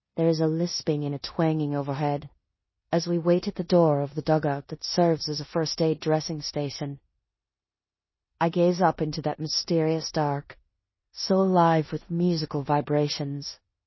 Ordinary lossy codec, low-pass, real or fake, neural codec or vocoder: MP3, 24 kbps; 7.2 kHz; fake; codec, 16 kHz in and 24 kHz out, 0.9 kbps, LongCat-Audio-Codec, four codebook decoder